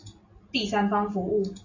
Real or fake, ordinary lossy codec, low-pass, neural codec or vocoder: real; AAC, 48 kbps; 7.2 kHz; none